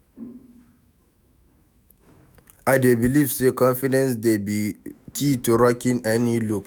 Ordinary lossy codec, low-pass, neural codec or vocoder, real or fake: none; none; autoencoder, 48 kHz, 128 numbers a frame, DAC-VAE, trained on Japanese speech; fake